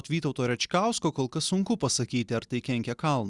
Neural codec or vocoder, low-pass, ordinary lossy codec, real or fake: none; 10.8 kHz; Opus, 64 kbps; real